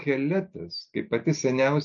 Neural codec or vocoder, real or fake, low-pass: none; real; 7.2 kHz